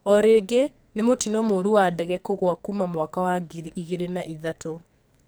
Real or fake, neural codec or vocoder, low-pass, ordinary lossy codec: fake; codec, 44.1 kHz, 2.6 kbps, SNAC; none; none